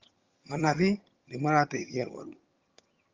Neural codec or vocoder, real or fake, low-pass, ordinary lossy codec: vocoder, 22.05 kHz, 80 mel bands, HiFi-GAN; fake; 7.2 kHz; Opus, 32 kbps